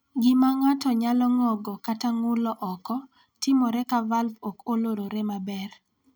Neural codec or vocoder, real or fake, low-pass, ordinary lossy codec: none; real; none; none